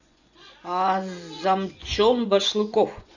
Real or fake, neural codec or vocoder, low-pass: fake; vocoder, 24 kHz, 100 mel bands, Vocos; 7.2 kHz